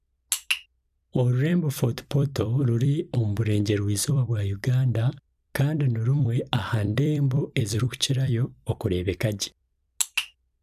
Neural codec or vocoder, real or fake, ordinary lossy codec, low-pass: vocoder, 44.1 kHz, 128 mel bands every 256 samples, BigVGAN v2; fake; none; 14.4 kHz